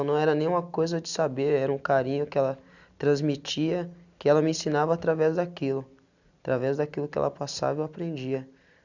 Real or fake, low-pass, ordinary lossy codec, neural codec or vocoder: real; 7.2 kHz; none; none